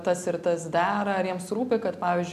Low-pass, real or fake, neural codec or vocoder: 14.4 kHz; real; none